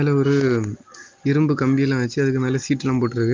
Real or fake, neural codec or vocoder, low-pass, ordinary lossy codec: real; none; 7.2 kHz; Opus, 24 kbps